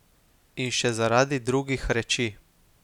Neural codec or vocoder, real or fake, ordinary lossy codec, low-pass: none; real; none; 19.8 kHz